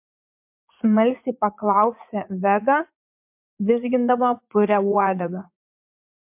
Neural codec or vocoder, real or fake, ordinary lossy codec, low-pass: vocoder, 44.1 kHz, 80 mel bands, Vocos; fake; MP3, 32 kbps; 3.6 kHz